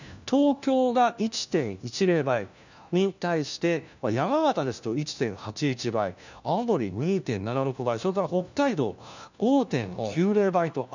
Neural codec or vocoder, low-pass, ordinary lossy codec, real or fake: codec, 16 kHz, 1 kbps, FunCodec, trained on LibriTTS, 50 frames a second; 7.2 kHz; none; fake